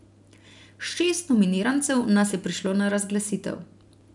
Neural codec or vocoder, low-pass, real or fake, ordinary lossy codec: none; 10.8 kHz; real; none